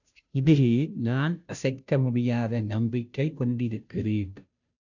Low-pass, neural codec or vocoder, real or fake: 7.2 kHz; codec, 16 kHz, 0.5 kbps, FunCodec, trained on Chinese and English, 25 frames a second; fake